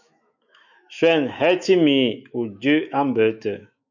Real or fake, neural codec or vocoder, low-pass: fake; autoencoder, 48 kHz, 128 numbers a frame, DAC-VAE, trained on Japanese speech; 7.2 kHz